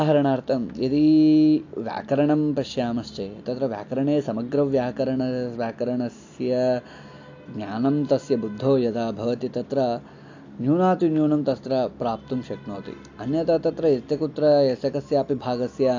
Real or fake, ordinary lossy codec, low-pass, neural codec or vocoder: real; AAC, 48 kbps; 7.2 kHz; none